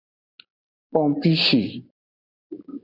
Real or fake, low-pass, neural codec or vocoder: real; 5.4 kHz; none